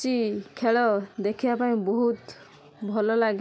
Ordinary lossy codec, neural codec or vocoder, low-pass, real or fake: none; none; none; real